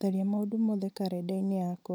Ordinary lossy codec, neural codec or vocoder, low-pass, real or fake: none; none; none; real